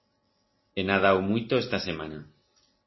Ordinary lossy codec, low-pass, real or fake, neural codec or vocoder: MP3, 24 kbps; 7.2 kHz; real; none